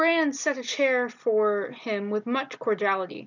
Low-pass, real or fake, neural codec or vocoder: 7.2 kHz; real; none